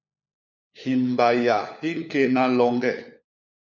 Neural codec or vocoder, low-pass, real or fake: codec, 16 kHz, 4 kbps, FunCodec, trained on LibriTTS, 50 frames a second; 7.2 kHz; fake